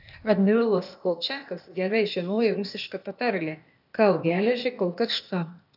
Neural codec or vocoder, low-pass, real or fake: codec, 16 kHz, 0.8 kbps, ZipCodec; 5.4 kHz; fake